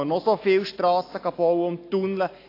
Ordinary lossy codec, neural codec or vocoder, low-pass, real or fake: AAC, 32 kbps; none; 5.4 kHz; real